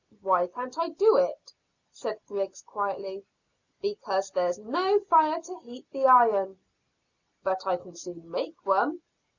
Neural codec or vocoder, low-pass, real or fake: none; 7.2 kHz; real